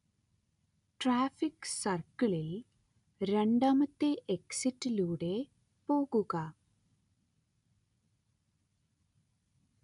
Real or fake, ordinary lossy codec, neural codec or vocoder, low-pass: real; none; none; 10.8 kHz